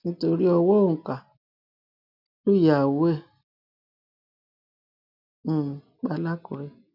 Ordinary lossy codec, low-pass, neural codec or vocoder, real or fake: none; 5.4 kHz; none; real